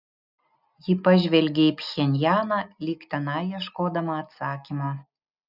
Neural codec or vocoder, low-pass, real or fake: none; 5.4 kHz; real